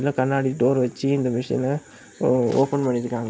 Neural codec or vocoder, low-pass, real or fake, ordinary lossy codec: none; none; real; none